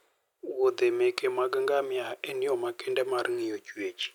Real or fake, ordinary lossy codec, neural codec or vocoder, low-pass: real; none; none; 19.8 kHz